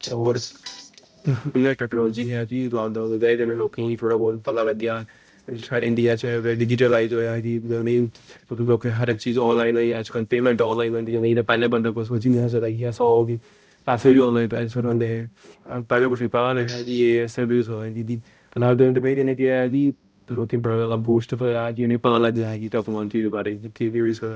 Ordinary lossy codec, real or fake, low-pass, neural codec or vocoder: none; fake; none; codec, 16 kHz, 0.5 kbps, X-Codec, HuBERT features, trained on balanced general audio